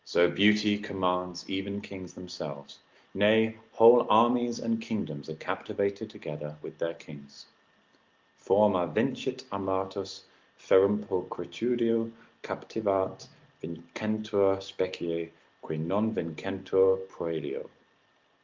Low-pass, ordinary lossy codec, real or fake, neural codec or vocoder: 7.2 kHz; Opus, 32 kbps; real; none